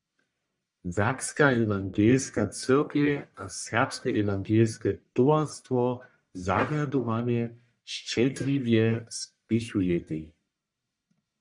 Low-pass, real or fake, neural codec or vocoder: 10.8 kHz; fake; codec, 44.1 kHz, 1.7 kbps, Pupu-Codec